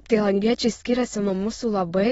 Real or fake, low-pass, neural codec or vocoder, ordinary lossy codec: fake; 9.9 kHz; autoencoder, 22.05 kHz, a latent of 192 numbers a frame, VITS, trained on many speakers; AAC, 24 kbps